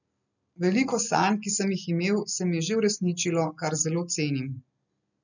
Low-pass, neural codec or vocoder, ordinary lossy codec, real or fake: 7.2 kHz; none; none; real